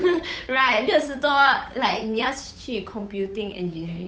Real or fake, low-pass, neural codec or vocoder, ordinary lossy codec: fake; none; codec, 16 kHz, 8 kbps, FunCodec, trained on Chinese and English, 25 frames a second; none